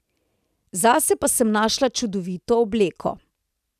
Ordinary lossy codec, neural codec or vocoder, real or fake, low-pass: none; none; real; 14.4 kHz